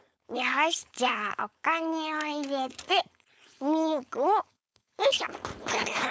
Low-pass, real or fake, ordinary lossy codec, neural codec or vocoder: none; fake; none; codec, 16 kHz, 4.8 kbps, FACodec